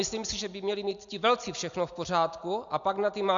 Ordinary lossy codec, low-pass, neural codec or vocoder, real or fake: MP3, 64 kbps; 7.2 kHz; none; real